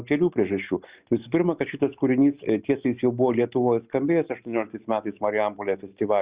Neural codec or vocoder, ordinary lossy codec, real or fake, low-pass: none; Opus, 64 kbps; real; 3.6 kHz